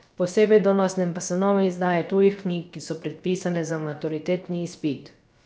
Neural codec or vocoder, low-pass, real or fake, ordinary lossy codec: codec, 16 kHz, about 1 kbps, DyCAST, with the encoder's durations; none; fake; none